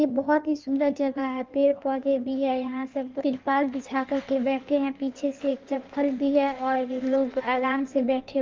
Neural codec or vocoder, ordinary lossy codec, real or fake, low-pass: codec, 16 kHz in and 24 kHz out, 1.1 kbps, FireRedTTS-2 codec; Opus, 24 kbps; fake; 7.2 kHz